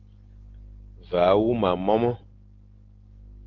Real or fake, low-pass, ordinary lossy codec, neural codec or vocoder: real; 7.2 kHz; Opus, 16 kbps; none